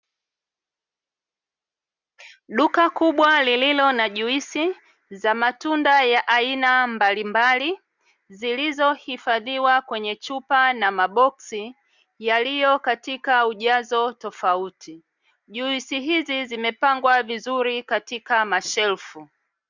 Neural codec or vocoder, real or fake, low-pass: none; real; 7.2 kHz